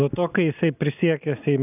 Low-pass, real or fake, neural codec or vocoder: 3.6 kHz; real; none